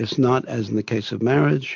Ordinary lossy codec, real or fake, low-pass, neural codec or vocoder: MP3, 48 kbps; real; 7.2 kHz; none